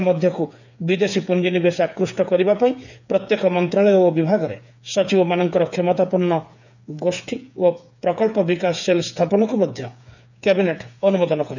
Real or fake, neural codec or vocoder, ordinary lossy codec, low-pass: fake; codec, 16 kHz, 8 kbps, FreqCodec, smaller model; none; 7.2 kHz